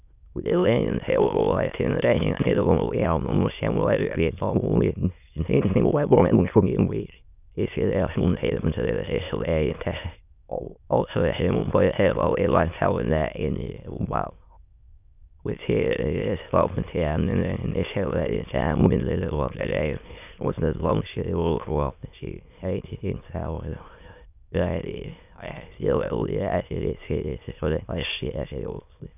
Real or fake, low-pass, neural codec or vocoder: fake; 3.6 kHz; autoencoder, 22.05 kHz, a latent of 192 numbers a frame, VITS, trained on many speakers